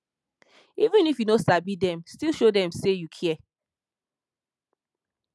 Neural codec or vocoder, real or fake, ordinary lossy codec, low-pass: none; real; none; none